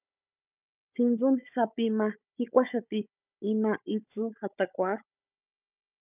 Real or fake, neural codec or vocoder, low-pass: fake; codec, 16 kHz, 16 kbps, FunCodec, trained on Chinese and English, 50 frames a second; 3.6 kHz